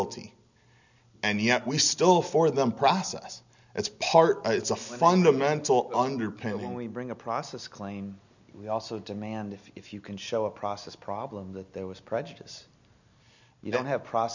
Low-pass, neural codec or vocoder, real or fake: 7.2 kHz; none; real